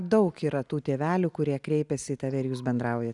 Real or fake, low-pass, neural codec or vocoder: real; 10.8 kHz; none